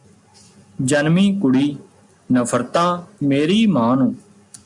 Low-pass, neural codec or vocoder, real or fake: 10.8 kHz; none; real